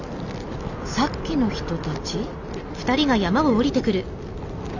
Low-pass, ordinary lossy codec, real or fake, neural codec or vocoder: 7.2 kHz; none; real; none